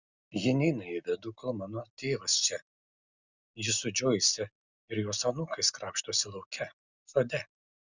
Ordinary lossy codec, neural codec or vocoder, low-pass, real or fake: Opus, 64 kbps; none; 7.2 kHz; real